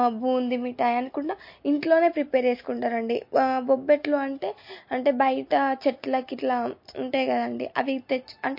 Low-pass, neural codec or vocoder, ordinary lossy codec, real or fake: 5.4 kHz; none; MP3, 32 kbps; real